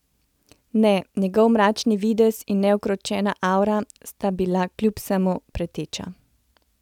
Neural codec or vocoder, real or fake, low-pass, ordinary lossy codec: none; real; 19.8 kHz; none